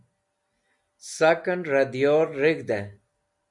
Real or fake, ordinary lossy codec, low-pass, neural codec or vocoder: real; MP3, 96 kbps; 10.8 kHz; none